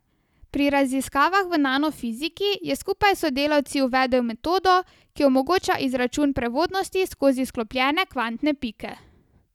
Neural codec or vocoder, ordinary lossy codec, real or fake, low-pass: none; none; real; 19.8 kHz